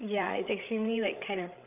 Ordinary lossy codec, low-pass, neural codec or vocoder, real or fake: none; 3.6 kHz; codec, 16 kHz, 8 kbps, FreqCodec, larger model; fake